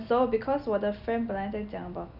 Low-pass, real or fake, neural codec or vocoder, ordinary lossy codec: 5.4 kHz; real; none; none